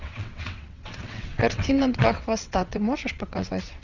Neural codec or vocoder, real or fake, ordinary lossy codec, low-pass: vocoder, 22.05 kHz, 80 mel bands, WaveNeXt; fake; Opus, 64 kbps; 7.2 kHz